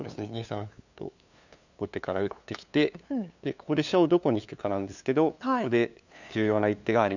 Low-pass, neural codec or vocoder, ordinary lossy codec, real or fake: 7.2 kHz; codec, 16 kHz, 2 kbps, FunCodec, trained on LibriTTS, 25 frames a second; none; fake